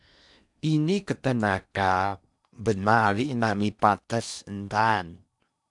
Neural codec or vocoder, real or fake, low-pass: codec, 16 kHz in and 24 kHz out, 0.8 kbps, FocalCodec, streaming, 65536 codes; fake; 10.8 kHz